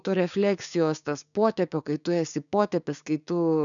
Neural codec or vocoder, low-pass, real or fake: codec, 16 kHz, 6 kbps, DAC; 7.2 kHz; fake